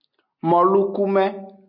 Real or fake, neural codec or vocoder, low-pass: real; none; 5.4 kHz